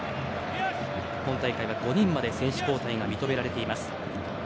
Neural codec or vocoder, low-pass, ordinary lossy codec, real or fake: none; none; none; real